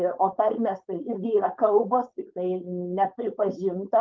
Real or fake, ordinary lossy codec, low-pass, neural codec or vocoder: fake; Opus, 32 kbps; 7.2 kHz; codec, 16 kHz, 4.8 kbps, FACodec